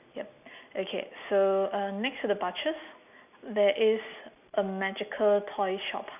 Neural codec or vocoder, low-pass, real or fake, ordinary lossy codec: none; 3.6 kHz; real; none